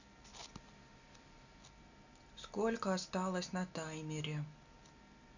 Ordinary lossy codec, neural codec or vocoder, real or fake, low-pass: none; none; real; 7.2 kHz